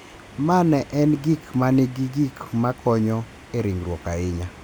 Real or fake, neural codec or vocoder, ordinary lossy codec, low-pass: real; none; none; none